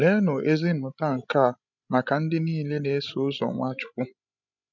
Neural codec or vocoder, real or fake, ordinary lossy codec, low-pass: codec, 16 kHz, 16 kbps, FreqCodec, larger model; fake; none; 7.2 kHz